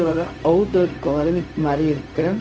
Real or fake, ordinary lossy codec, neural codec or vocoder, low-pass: fake; none; codec, 16 kHz, 0.4 kbps, LongCat-Audio-Codec; none